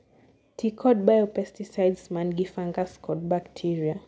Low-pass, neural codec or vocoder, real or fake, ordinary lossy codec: none; none; real; none